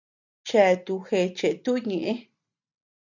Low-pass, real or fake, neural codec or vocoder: 7.2 kHz; real; none